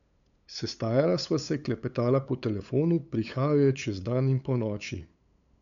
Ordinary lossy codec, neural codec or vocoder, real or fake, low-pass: none; codec, 16 kHz, 8 kbps, FunCodec, trained on LibriTTS, 25 frames a second; fake; 7.2 kHz